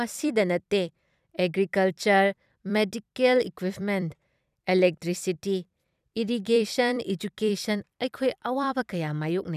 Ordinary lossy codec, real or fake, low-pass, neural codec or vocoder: none; fake; 14.4 kHz; vocoder, 44.1 kHz, 128 mel bands every 256 samples, BigVGAN v2